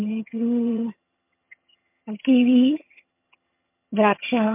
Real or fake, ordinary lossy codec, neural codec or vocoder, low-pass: fake; MP3, 32 kbps; vocoder, 22.05 kHz, 80 mel bands, HiFi-GAN; 3.6 kHz